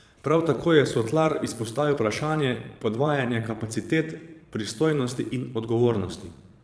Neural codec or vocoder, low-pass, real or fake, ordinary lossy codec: vocoder, 22.05 kHz, 80 mel bands, Vocos; none; fake; none